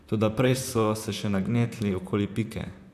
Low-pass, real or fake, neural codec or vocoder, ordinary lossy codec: 14.4 kHz; fake; vocoder, 44.1 kHz, 128 mel bands, Pupu-Vocoder; none